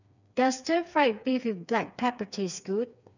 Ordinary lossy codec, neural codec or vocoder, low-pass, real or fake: none; codec, 16 kHz, 4 kbps, FreqCodec, smaller model; 7.2 kHz; fake